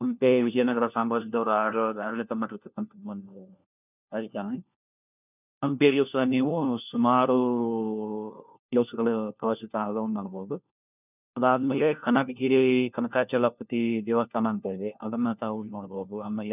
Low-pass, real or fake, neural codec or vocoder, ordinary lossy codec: 3.6 kHz; fake; codec, 16 kHz, 1 kbps, FunCodec, trained on LibriTTS, 50 frames a second; none